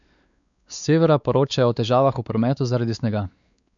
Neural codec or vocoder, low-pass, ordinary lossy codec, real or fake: codec, 16 kHz, 4 kbps, X-Codec, WavLM features, trained on Multilingual LibriSpeech; 7.2 kHz; none; fake